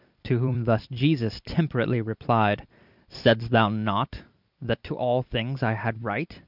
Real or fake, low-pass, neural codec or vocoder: fake; 5.4 kHz; vocoder, 44.1 kHz, 128 mel bands every 256 samples, BigVGAN v2